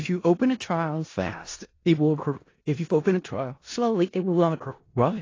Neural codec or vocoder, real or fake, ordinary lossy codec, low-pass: codec, 16 kHz in and 24 kHz out, 0.4 kbps, LongCat-Audio-Codec, four codebook decoder; fake; AAC, 32 kbps; 7.2 kHz